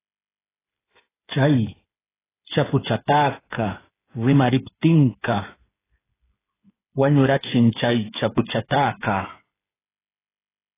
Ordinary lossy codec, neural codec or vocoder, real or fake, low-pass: AAC, 16 kbps; codec, 16 kHz, 8 kbps, FreqCodec, smaller model; fake; 3.6 kHz